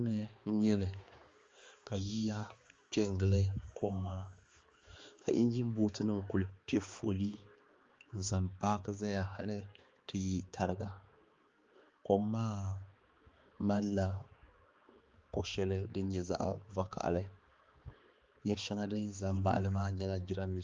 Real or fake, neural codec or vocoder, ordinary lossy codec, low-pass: fake; codec, 16 kHz, 2 kbps, X-Codec, HuBERT features, trained on balanced general audio; Opus, 32 kbps; 7.2 kHz